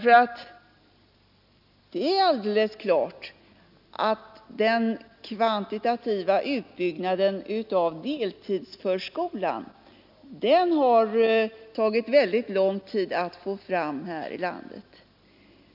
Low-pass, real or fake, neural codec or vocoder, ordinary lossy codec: 5.4 kHz; real; none; none